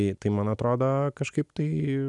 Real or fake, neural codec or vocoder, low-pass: real; none; 10.8 kHz